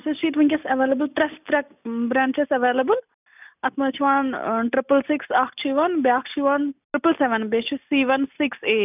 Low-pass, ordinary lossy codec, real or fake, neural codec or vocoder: 3.6 kHz; none; real; none